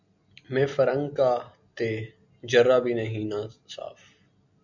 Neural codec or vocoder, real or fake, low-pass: none; real; 7.2 kHz